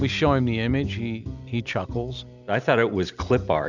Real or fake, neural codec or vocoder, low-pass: real; none; 7.2 kHz